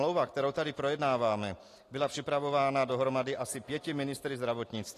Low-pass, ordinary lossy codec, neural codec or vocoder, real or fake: 14.4 kHz; AAC, 48 kbps; none; real